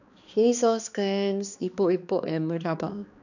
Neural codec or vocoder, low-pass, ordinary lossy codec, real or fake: codec, 16 kHz, 2 kbps, X-Codec, HuBERT features, trained on balanced general audio; 7.2 kHz; none; fake